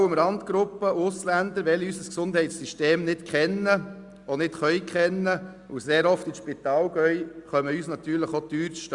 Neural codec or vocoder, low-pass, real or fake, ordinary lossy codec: none; 10.8 kHz; real; Opus, 64 kbps